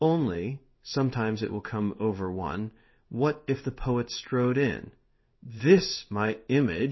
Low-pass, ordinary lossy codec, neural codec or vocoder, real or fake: 7.2 kHz; MP3, 24 kbps; codec, 16 kHz in and 24 kHz out, 1 kbps, XY-Tokenizer; fake